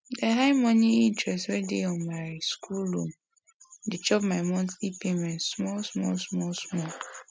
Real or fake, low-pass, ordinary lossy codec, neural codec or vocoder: real; none; none; none